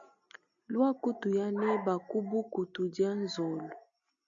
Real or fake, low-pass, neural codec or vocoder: real; 7.2 kHz; none